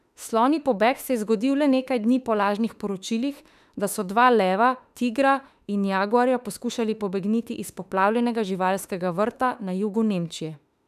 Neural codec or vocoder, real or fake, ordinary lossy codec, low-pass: autoencoder, 48 kHz, 32 numbers a frame, DAC-VAE, trained on Japanese speech; fake; none; 14.4 kHz